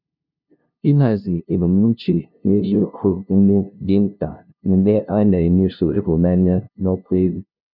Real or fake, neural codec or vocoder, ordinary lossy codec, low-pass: fake; codec, 16 kHz, 0.5 kbps, FunCodec, trained on LibriTTS, 25 frames a second; none; 5.4 kHz